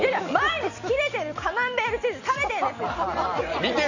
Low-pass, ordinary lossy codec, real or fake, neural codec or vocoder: 7.2 kHz; none; real; none